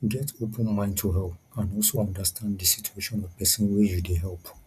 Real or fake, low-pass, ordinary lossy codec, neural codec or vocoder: real; 14.4 kHz; none; none